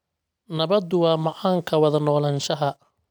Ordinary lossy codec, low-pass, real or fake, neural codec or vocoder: none; none; real; none